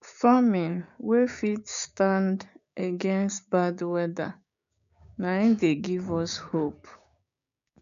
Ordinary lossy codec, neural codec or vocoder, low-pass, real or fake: none; codec, 16 kHz, 6 kbps, DAC; 7.2 kHz; fake